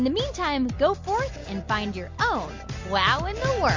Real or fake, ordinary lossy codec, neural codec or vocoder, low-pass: real; MP3, 48 kbps; none; 7.2 kHz